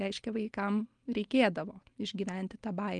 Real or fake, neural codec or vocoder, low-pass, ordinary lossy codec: real; none; 9.9 kHz; Opus, 24 kbps